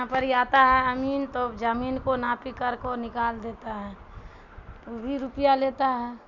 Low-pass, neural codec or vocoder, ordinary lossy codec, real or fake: 7.2 kHz; none; none; real